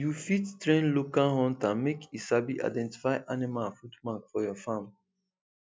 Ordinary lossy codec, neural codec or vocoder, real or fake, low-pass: none; none; real; none